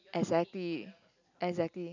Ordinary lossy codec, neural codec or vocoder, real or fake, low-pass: none; none; real; 7.2 kHz